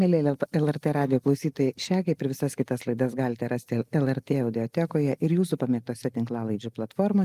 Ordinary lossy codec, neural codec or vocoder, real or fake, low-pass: Opus, 32 kbps; none; real; 14.4 kHz